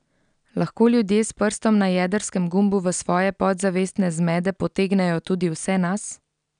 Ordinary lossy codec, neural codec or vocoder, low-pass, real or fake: none; none; 9.9 kHz; real